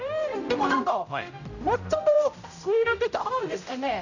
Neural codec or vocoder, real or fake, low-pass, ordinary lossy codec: codec, 16 kHz, 0.5 kbps, X-Codec, HuBERT features, trained on general audio; fake; 7.2 kHz; none